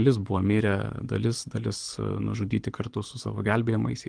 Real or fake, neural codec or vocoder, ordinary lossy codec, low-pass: fake; codec, 24 kHz, 6 kbps, HILCodec; Opus, 24 kbps; 9.9 kHz